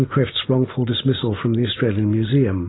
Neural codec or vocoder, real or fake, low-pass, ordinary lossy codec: none; real; 7.2 kHz; AAC, 16 kbps